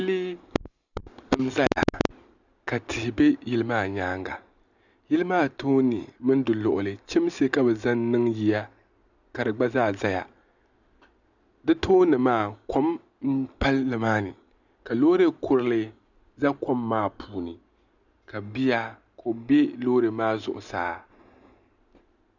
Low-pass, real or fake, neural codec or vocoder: 7.2 kHz; real; none